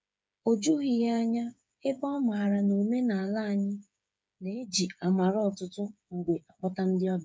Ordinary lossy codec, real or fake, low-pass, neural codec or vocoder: none; fake; none; codec, 16 kHz, 8 kbps, FreqCodec, smaller model